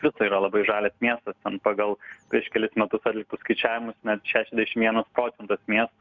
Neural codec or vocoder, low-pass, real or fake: none; 7.2 kHz; real